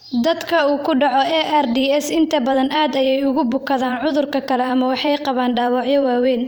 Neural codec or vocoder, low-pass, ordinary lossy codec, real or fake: vocoder, 44.1 kHz, 128 mel bands every 512 samples, BigVGAN v2; 19.8 kHz; none; fake